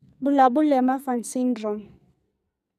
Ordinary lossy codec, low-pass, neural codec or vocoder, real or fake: none; 14.4 kHz; codec, 44.1 kHz, 2.6 kbps, SNAC; fake